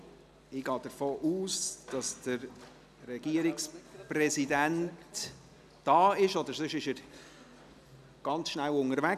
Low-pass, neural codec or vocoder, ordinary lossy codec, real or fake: 14.4 kHz; none; none; real